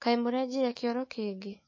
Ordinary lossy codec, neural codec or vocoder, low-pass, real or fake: MP3, 32 kbps; autoencoder, 48 kHz, 128 numbers a frame, DAC-VAE, trained on Japanese speech; 7.2 kHz; fake